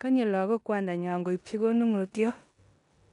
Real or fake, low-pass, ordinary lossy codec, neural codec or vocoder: fake; 10.8 kHz; none; codec, 16 kHz in and 24 kHz out, 0.9 kbps, LongCat-Audio-Codec, four codebook decoder